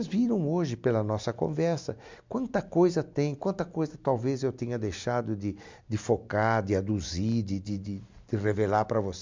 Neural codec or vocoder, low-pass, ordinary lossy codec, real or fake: none; 7.2 kHz; MP3, 64 kbps; real